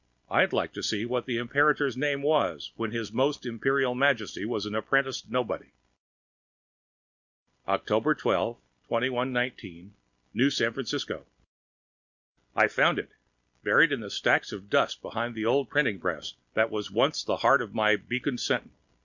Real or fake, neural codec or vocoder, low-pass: real; none; 7.2 kHz